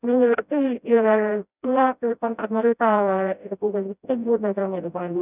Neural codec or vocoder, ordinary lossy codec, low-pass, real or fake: codec, 16 kHz, 0.5 kbps, FreqCodec, smaller model; none; 3.6 kHz; fake